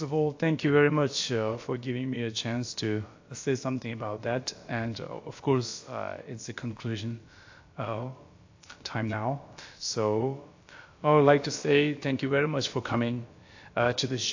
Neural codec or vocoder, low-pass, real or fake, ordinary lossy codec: codec, 16 kHz, about 1 kbps, DyCAST, with the encoder's durations; 7.2 kHz; fake; AAC, 48 kbps